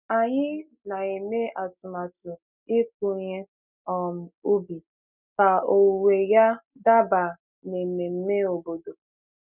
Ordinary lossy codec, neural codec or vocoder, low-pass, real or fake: none; none; 3.6 kHz; real